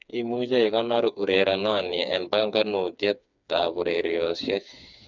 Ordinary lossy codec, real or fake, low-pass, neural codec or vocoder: none; fake; 7.2 kHz; codec, 16 kHz, 4 kbps, FreqCodec, smaller model